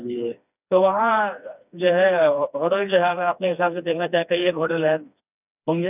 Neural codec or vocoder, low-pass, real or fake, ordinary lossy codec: codec, 16 kHz, 2 kbps, FreqCodec, smaller model; 3.6 kHz; fake; none